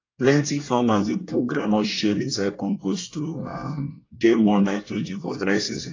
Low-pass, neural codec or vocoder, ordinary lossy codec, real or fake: 7.2 kHz; codec, 24 kHz, 1 kbps, SNAC; AAC, 32 kbps; fake